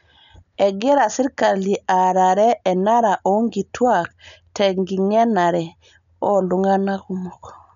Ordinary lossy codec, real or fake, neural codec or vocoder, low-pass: none; real; none; 7.2 kHz